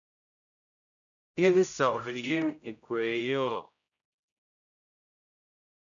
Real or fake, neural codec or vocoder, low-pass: fake; codec, 16 kHz, 0.5 kbps, X-Codec, HuBERT features, trained on general audio; 7.2 kHz